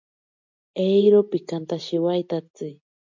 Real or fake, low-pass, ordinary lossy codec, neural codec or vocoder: real; 7.2 kHz; MP3, 48 kbps; none